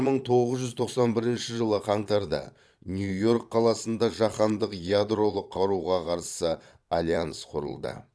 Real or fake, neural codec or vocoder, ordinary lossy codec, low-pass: fake; vocoder, 22.05 kHz, 80 mel bands, Vocos; none; none